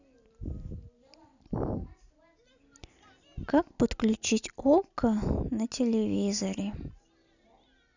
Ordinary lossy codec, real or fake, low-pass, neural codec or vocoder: none; real; 7.2 kHz; none